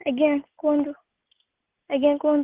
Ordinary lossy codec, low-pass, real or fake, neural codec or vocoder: Opus, 16 kbps; 3.6 kHz; real; none